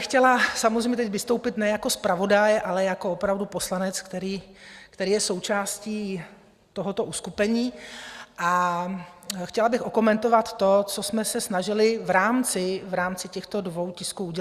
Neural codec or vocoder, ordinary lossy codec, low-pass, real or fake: none; Opus, 64 kbps; 14.4 kHz; real